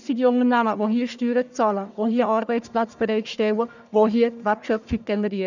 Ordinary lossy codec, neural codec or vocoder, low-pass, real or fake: none; codec, 44.1 kHz, 1.7 kbps, Pupu-Codec; 7.2 kHz; fake